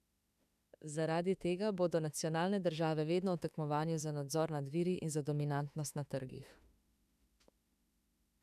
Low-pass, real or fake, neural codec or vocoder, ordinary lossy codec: 14.4 kHz; fake; autoencoder, 48 kHz, 32 numbers a frame, DAC-VAE, trained on Japanese speech; none